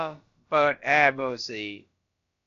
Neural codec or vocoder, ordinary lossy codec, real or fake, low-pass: codec, 16 kHz, about 1 kbps, DyCAST, with the encoder's durations; AAC, 48 kbps; fake; 7.2 kHz